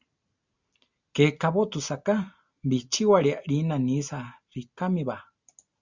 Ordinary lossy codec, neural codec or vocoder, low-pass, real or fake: Opus, 64 kbps; none; 7.2 kHz; real